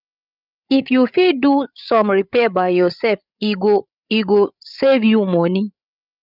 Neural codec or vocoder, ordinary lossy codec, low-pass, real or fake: codec, 16 kHz, 8 kbps, FreqCodec, larger model; none; 5.4 kHz; fake